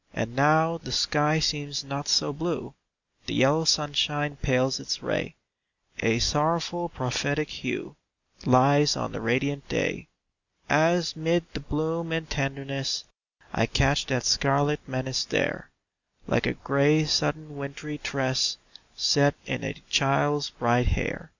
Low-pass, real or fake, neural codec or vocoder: 7.2 kHz; real; none